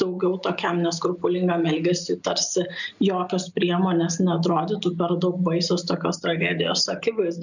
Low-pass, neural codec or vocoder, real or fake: 7.2 kHz; none; real